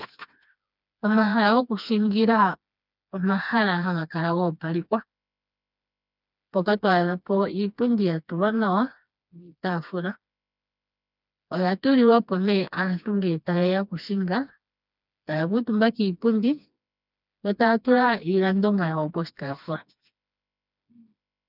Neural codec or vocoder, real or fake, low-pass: codec, 16 kHz, 2 kbps, FreqCodec, smaller model; fake; 5.4 kHz